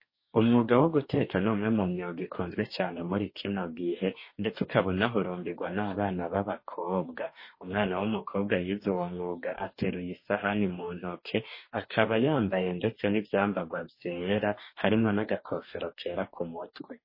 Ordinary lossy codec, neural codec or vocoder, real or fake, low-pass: MP3, 24 kbps; codec, 44.1 kHz, 2.6 kbps, DAC; fake; 5.4 kHz